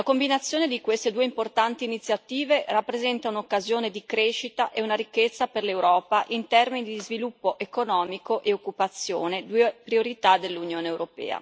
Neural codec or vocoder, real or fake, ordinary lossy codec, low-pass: none; real; none; none